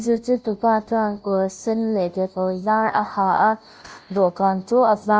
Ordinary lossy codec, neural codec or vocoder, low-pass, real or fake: none; codec, 16 kHz, 0.5 kbps, FunCodec, trained on Chinese and English, 25 frames a second; none; fake